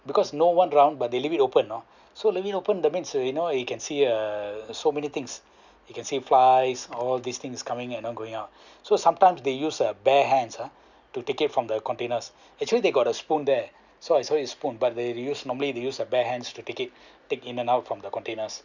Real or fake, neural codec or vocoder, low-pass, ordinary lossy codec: real; none; 7.2 kHz; none